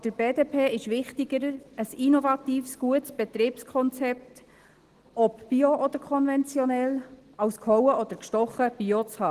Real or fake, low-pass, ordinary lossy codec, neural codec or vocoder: real; 14.4 kHz; Opus, 16 kbps; none